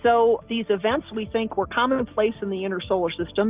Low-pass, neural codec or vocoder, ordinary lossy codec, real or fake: 3.6 kHz; none; Opus, 24 kbps; real